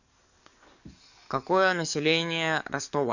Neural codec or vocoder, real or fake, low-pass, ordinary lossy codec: codec, 44.1 kHz, 7.8 kbps, DAC; fake; 7.2 kHz; none